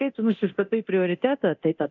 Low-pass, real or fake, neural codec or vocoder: 7.2 kHz; fake; codec, 24 kHz, 0.9 kbps, DualCodec